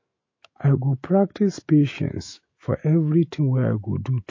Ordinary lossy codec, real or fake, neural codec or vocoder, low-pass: MP3, 32 kbps; fake; autoencoder, 48 kHz, 128 numbers a frame, DAC-VAE, trained on Japanese speech; 7.2 kHz